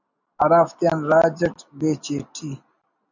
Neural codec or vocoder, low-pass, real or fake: none; 7.2 kHz; real